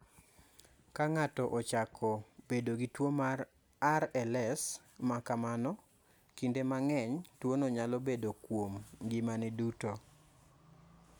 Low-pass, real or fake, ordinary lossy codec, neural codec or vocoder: none; real; none; none